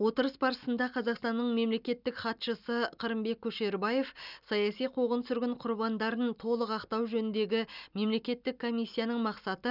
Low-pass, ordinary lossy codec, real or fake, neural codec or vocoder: 5.4 kHz; none; real; none